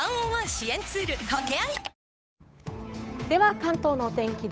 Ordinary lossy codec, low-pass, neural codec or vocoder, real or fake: none; none; codec, 16 kHz, 8 kbps, FunCodec, trained on Chinese and English, 25 frames a second; fake